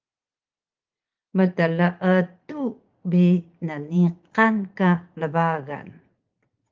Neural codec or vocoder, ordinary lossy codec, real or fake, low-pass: vocoder, 44.1 kHz, 80 mel bands, Vocos; Opus, 32 kbps; fake; 7.2 kHz